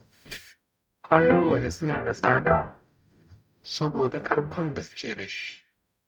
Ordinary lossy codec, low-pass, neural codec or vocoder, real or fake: none; 19.8 kHz; codec, 44.1 kHz, 0.9 kbps, DAC; fake